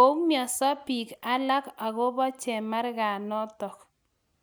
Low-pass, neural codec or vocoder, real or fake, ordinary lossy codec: none; none; real; none